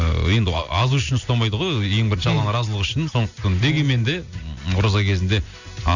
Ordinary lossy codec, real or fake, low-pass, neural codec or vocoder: none; real; 7.2 kHz; none